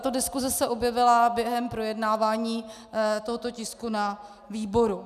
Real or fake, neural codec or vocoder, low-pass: real; none; 14.4 kHz